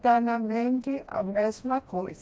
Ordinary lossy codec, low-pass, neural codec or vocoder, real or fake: none; none; codec, 16 kHz, 1 kbps, FreqCodec, smaller model; fake